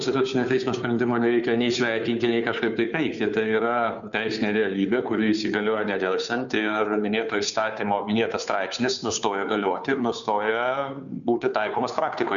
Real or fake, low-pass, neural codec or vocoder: fake; 7.2 kHz; codec, 16 kHz, 2 kbps, FunCodec, trained on Chinese and English, 25 frames a second